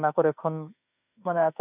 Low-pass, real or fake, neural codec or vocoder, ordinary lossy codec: 3.6 kHz; fake; autoencoder, 48 kHz, 32 numbers a frame, DAC-VAE, trained on Japanese speech; none